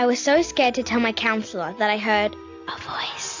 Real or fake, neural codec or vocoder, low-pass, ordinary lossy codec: real; none; 7.2 kHz; AAC, 48 kbps